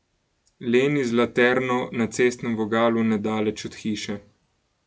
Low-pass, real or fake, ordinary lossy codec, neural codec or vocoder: none; real; none; none